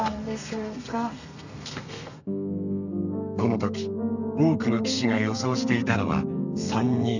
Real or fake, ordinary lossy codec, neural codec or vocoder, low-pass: fake; none; codec, 32 kHz, 1.9 kbps, SNAC; 7.2 kHz